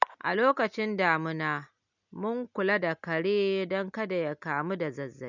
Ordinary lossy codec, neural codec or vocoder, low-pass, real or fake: none; none; 7.2 kHz; real